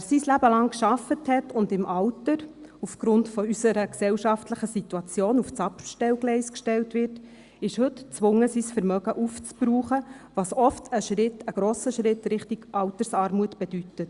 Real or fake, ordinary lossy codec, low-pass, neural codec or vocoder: real; Opus, 64 kbps; 10.8 kHz; none